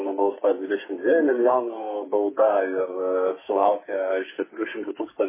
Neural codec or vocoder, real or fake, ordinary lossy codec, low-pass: codec, 44.1 kHz, 2.6 kbps, SNAC; fake; MP3, 16 kbps; 3.6 kHz